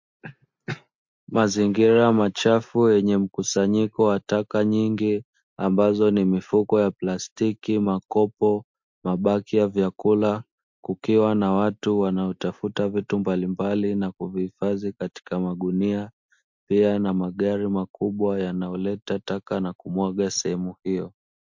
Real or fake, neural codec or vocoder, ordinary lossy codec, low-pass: real; none; MP3, 48 kbps; 7.2 kHz